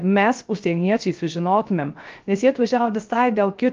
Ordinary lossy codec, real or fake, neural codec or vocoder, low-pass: Opus, 24 kbps; fake; codec, 16 kHz, 0.3 kbps, FocalCodec; 7.2 kHz